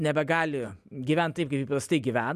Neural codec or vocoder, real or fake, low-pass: none; real; 14.4 kHz